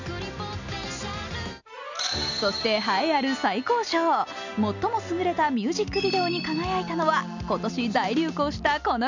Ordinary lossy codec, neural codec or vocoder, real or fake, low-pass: none; none; real; 7.2 kHz